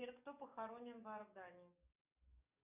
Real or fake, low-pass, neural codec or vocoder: fake; 3.6 kHz; codec, 44.1 kHz, 7.8 kbps, DAC